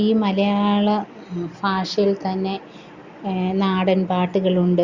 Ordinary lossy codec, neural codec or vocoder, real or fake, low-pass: Opus, 64 kbps; none; real; 7.2 kHz